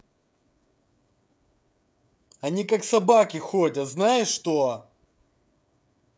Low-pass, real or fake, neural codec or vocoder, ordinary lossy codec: none; fake; codec, 16 kHz, 16 kbps, FreqCodec, smaller model; none